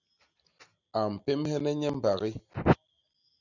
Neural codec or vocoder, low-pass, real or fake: none; 7.2 kHz; real